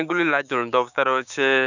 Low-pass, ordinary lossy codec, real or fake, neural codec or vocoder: 7.2 kHz; none; fake; codec, 16 kHz, 6 kbps, DAC